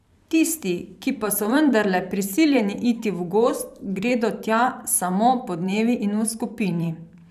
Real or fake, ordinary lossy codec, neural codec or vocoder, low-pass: fake; none; vocoder, 44.1 kHz, 128 mel bands every 512 samples, BigVGAN v2; 14.4 kHz